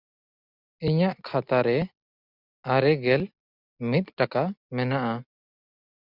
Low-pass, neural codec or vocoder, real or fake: 5.4 kHz; none; real